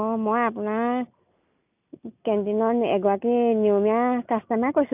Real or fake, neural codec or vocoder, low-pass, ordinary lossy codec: real; none; 3.6 kHz; none